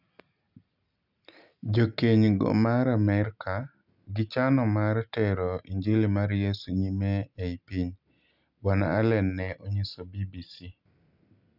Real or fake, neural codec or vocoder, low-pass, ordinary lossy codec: real; none; 5.4 kHz; none